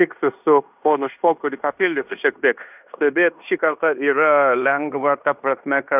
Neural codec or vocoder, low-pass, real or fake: codec, 16 kHz in and 24 kHz out, 0.9 kbps, LongCat-Audio-Codec, fine tuned four codebook decoder; 3.6 kHz; fake